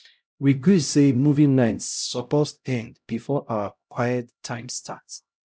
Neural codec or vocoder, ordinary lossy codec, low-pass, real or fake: codec, 16 kHz, 0.5 kbps, X-Codec, HuBERT features, trained on LibriSpeech; none; none; fake